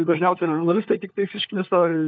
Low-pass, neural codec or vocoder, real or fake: 7.2 kHz; codec, 16 kHz, 4 kbps, FunCodec, trained on LibriTTS, 50 frames a second; fake